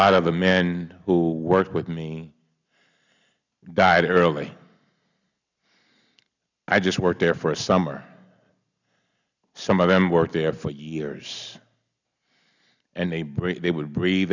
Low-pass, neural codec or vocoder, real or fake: 7.2 kHz; none; real